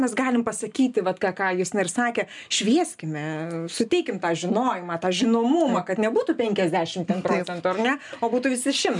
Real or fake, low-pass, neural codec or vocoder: real; 10.8 kHz; none